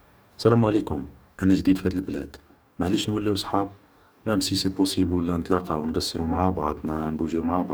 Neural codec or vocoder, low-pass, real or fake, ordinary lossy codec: codec, 44.1 kHz, 2.6 kbps, DAC; none; fake; none